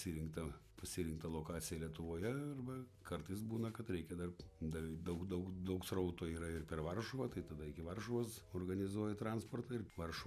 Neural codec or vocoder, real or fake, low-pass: none; real; 14.4 kHz